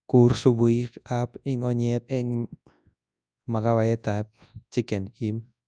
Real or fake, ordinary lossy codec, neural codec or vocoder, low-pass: fake; none; codec, 24 kHz, 0.9 kbps, WavTokenizer, large speech release; 9.9 kHz